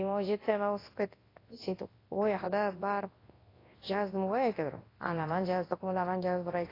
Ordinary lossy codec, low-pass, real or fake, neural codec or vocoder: AAC, 24 kbps; 5.4 kHz; fake; codec, 24 kHz, 0.9 kbps, WavTokenizer, large speech release